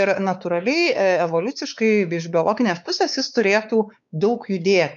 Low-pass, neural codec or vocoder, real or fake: 7.2 kHz; codec, 16 kHz, 4 kbps, X-Codec, WavLM features, trained on Multilingual LibriSpeech; fake